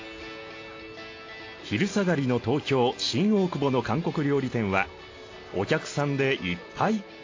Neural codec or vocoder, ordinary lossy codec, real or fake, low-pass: none; AAC, 32 kbps; real; 7.2 kHz